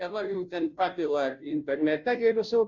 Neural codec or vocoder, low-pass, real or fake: codec, 16 kHz, 0.5 kbps, FunCodec, trained on Chinese and English, 25 frames a second; 7.2 kHz; fake